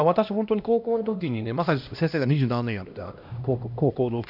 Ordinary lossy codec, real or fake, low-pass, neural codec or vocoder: none; fake; 5.4 kHz; codec, 16 kHz, 1 kbps, X-Codec, HuBERT features, trained on LibriSpeech